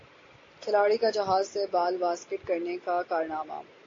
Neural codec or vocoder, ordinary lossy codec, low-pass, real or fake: none; AAC, 32 kbps; 7.2 kHz; real